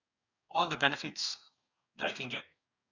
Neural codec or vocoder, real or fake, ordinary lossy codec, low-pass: codec, 44.1 kHz, 2.6 kbps, DAC; fake; none; 7.2 kHz